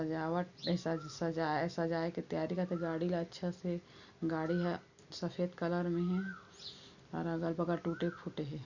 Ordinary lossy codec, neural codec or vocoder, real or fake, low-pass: none; none; real; 7.2 kHz